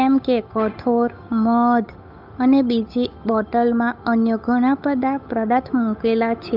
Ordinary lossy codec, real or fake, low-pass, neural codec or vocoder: none; fake; 5.4 kHz; codec, 16 kHz, 8 kbps, FunCodec, trained on Chinese and English, 25 frames a second